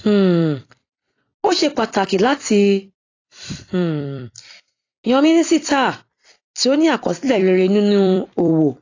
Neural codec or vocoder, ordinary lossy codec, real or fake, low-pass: vocoder, 44.1 kHz, 128 mel bands every 256 samples, BigVGAN v2; AAC, 32 kbps; fake; 7.2 kHz